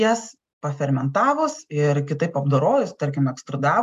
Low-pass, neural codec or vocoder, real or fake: 14.4 kHz; none; real